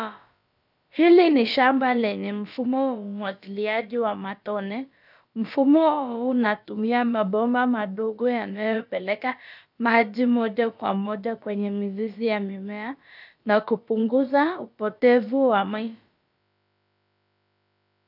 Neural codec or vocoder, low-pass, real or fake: codec, 16 kHz, about 1 kbps, DyCAST, with the encoder's durations; 5.4 kHz; fake